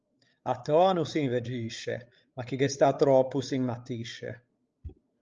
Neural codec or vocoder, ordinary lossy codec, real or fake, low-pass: codec, 16 kHz, 16 kbps, FreqCodec, larger model; Opus, 24 kbps; fake; 7.2 kHz